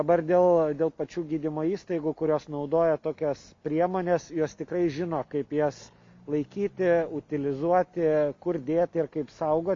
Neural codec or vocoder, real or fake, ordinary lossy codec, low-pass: none; real; MP3, 48 kbps; 7.2 kHz